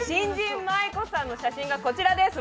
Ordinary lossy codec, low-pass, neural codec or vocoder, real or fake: none; none; none; real